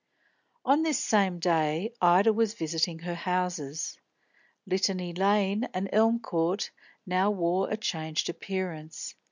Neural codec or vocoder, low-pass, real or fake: none; 7.2 kHz; real